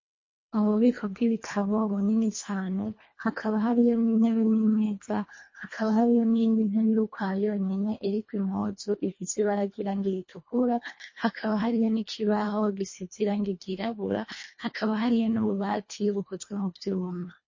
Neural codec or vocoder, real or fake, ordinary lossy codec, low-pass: codec, 24 kHz, 1.5 kbps, HILCodec; fake; MP3, 32 kbps; 7.2 kHz